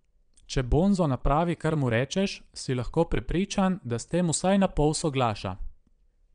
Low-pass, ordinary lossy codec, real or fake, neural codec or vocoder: 9.9 kHz; none; fake; vocoder, 22.05 kHz, 80 mel bands, Vocos